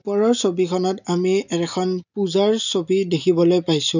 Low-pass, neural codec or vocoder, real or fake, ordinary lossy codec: 7.2 kHz; none; real; none